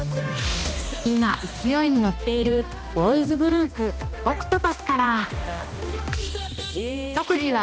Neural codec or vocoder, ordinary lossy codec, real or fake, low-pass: codec, 16 kHz, 1 kbps, X-Codec, HuBERT features, trained on balanced general audio; none; fake; none